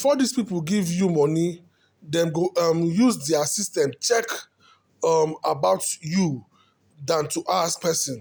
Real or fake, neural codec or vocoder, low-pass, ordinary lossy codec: real; none; none; none